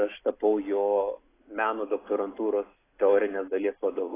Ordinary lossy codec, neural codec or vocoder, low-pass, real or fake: AAC, 16 kbps; none; 3.6 kHz; real